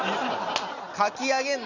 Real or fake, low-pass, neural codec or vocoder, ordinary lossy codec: real; 7.2 kHz; none; none